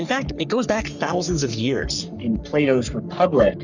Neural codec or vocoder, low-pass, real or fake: codec, 44.1 kHz, 3.4 kbps, Pupu-Codec; 7.2 kHz; fake